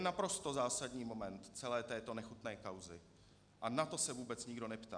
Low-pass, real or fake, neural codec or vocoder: 9.9 kHz; real; none